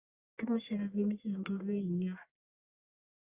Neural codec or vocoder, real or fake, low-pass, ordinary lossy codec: codec, 44.1 kHz, 1.7 kbps, Pupu-Codec; fake; 3.6 kHz; Opus, 64 kbps